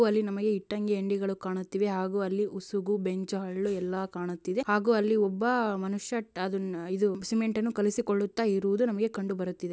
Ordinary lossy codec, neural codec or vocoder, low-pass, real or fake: none; none; none; real